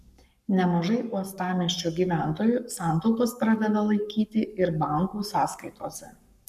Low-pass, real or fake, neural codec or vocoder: 14.4 kHz; fake; codec, 44.1 kHz, 7.8 kbps, Pupu-Codec